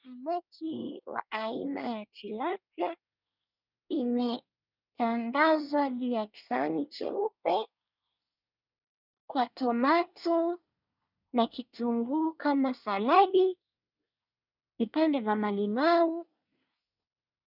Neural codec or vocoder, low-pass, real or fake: codec, 24 kHz, 1 kbps, SNAC; 5.4 kHz; fake